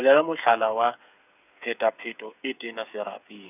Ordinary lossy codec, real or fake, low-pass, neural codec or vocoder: none; fake; 3.6 kHz; codec, 16 kHz, 8 kbps, FreqCodec, smaller model